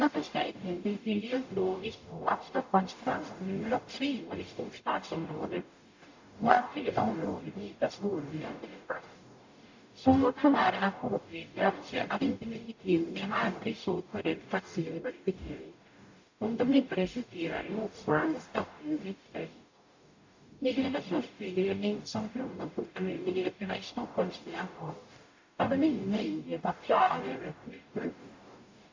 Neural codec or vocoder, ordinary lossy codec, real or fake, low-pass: codec, 44.1 kHz, 0.9 kbps, DAC; AAC, 48 kbps; fake; 7.2 kHz